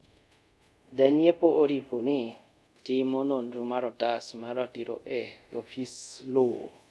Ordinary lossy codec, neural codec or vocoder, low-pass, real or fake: none; codec, 24 kHz, 0.5 kbps, DualCodec; none; fake